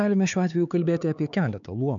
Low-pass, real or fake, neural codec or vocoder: 7.2 kHz; fake; codec, 16 kHz, 4 kbps, X-Codec, HuBERT features, trained on LibriSpeech